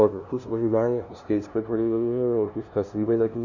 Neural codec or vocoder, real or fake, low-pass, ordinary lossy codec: codec, 16 kHz, 0.5 kbps, FunCodec, trained on LibriTTS, 25 frames a second; fake; 7.2 kHz; none